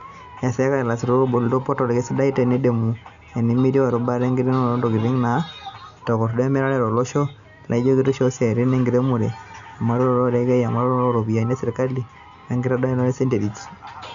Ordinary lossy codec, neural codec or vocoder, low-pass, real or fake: none; none; 7.2 kHz; real